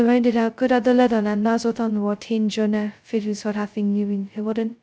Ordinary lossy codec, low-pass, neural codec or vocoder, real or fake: none; none; codec, 16 kHz, 0.2 kbps, FocalCodec; fake